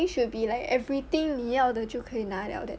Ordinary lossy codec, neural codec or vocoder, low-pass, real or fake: none; none; none; real